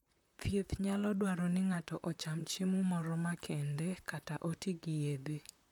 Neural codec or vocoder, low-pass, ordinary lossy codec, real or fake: vocoder, 44.1 kHz, 128 mel bands, Pupu-Vocoder; 19.8 kHz; none; fake